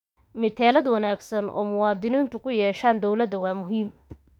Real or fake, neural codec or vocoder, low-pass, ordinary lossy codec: fake; autoencoder, 48 kHz, 32 numbers a frame, DAC-VAE, trained on Japanese speech; 19.8 kHz; none